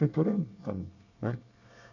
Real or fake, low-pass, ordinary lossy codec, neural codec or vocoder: fake; 7.2 kHz; none; codec, 24 kHz, 1 kbps, SNAC